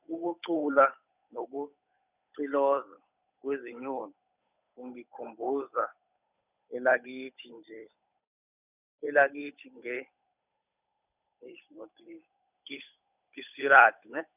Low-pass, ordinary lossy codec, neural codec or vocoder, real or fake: 3.6 kHz; none; codec, 16 kHz, 8 kbps, FunCodec, trained on Chinese and English, 25 frames a second; fake